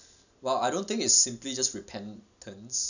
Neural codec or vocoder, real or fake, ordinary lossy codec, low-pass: none; real; none; 7.2 kHz